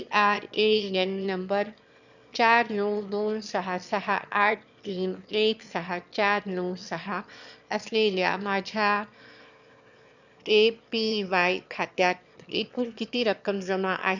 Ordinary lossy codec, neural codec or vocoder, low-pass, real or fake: none; autoencoder, 22.05 kHz, a latent of 192 numbers a frame, VITS, trained on one speaker; 7.2 kHz; fake